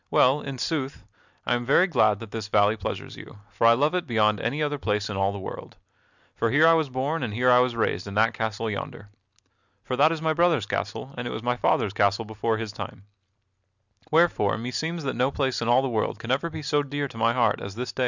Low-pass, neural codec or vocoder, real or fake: 7.2 kHz; none; real